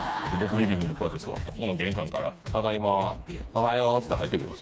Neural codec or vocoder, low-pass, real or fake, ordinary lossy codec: codec, 16 kHz, 2 kbps, FreqCodec, smaller model; none; fake; none